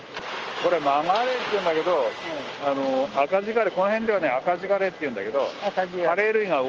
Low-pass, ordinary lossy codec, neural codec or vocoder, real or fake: 7.2 kHz; Opus, 24 kbps; none; real